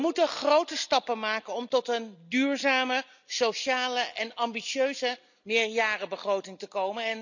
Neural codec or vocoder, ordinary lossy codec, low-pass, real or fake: none; none; 7.2 kHz; real